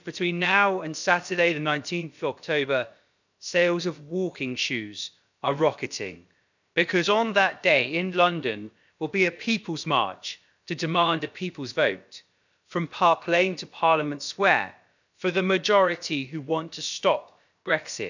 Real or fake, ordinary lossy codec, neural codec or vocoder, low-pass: fake; none; codec, 16 kHz, about 1 kbps, DyCAST, with the encoder's durations; 7.2 kHz